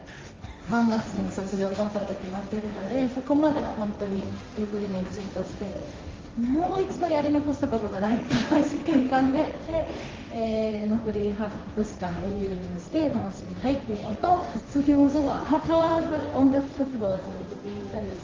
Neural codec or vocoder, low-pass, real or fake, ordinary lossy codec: codec, 16 kHz, 1.1 kbps, Voila-Tokenizer; 7.2 kHz; fake; Opus, 32 kbps